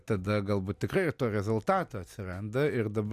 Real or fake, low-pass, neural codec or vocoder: real; 14.4 kHz; none